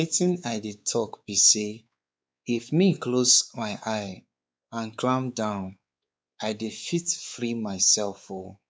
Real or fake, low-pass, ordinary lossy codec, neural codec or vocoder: fake; none; none; codec, 16 kHz, 4 kbps, X-Codec, WavLM features, trained on Multilingual LibriSpeech